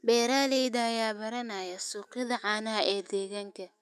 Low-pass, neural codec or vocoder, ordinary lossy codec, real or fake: 14.4 kHz; autoencoder, 48 kHz, 128 numbers a frame, DAC-VAE, trained on Japanese speech; none; fake